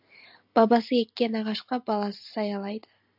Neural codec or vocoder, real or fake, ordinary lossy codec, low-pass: none; real; AAC, 48 kbps; 5.4 kHz